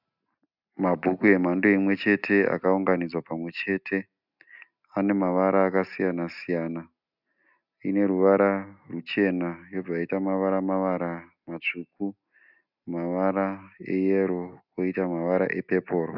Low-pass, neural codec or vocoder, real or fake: 5.4 kHz; none; real